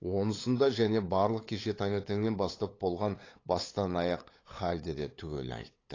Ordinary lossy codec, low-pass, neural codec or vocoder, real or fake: AAC, 32 kbps; 7.2 kHz; codec, 16 kHz, 8 kbps, FunCodec, trained on LibriTTS, 25 frames a second; fake